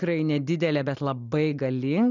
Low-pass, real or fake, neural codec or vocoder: 7.2 kHz; real; none